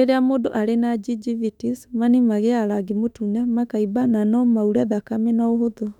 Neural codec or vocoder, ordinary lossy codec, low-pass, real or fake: autoencoder, 48 kHz, 32 numbers a frame, DAC-VAE, trained on Japanese speech; none; 19.8 kHz; fake